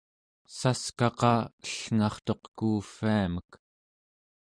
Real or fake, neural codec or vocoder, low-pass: real; none; 9.9 kHz